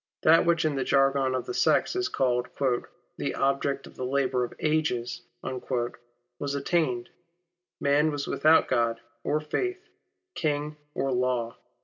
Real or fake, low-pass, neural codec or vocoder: real; 7.2 kHz; none